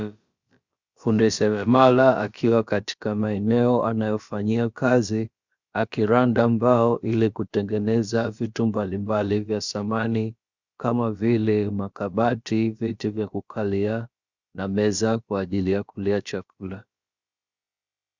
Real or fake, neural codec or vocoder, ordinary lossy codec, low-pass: fake; codec, 16 kHz, about 1 kbps, DyCAST, with the encoder's durations; Opus, 64 kbps; 7.2 kHz